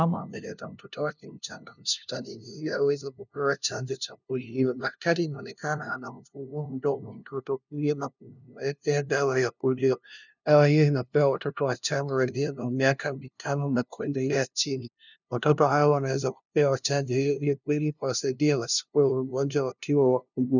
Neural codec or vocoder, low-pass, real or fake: codec, 16 kHz, 0.5 kbps, FunCodec, trained on LibriTTS, 25 frames a second; 7.2 kHz; fake